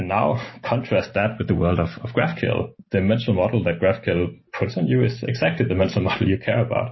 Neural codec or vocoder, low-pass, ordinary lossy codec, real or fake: none; 7.2 kHz; MP3, 24 kbps; real